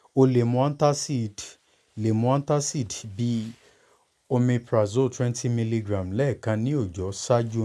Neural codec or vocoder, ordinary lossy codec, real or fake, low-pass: none; none; real; none